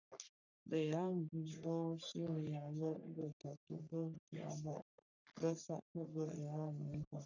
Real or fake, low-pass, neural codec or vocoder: fake; 7.2 kHz; codec, 44.1 kHz, 3.4 kbps, Pupu-Codec